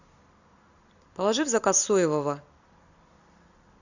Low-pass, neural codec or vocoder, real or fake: 7.2 kHz; vocoder, 44.1 kHz, 128 mel bands every 256 samples, BigVGAN v2; fake